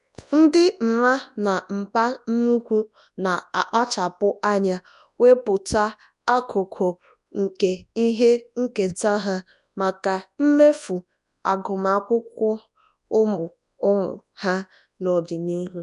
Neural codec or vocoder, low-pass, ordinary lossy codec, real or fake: codec, 24 kHz, 0.9 kbps, WavTokenizer, large speech release; 10.8 kHz; none; fake